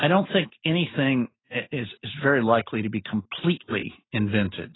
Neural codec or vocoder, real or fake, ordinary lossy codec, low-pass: none; real; AAC, 16 kbps; 7.2 kHz